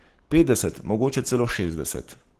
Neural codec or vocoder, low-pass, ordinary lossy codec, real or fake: codec, 44.1 kHz, 7.8 kbps, Pupu-Codec; 14.4 kHz; Opus, 16 kbps; fake